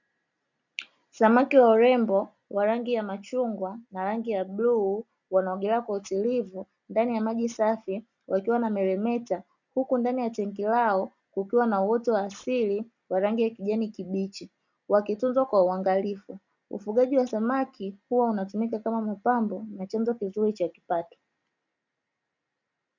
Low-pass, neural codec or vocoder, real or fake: 7.2 kHz; none; real